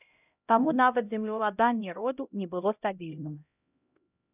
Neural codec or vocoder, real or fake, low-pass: codec, 16 kHz, 0.5 kbps, X-Codec, HuBERT features, trained on LibriSpeech; fake; 3.6 kHz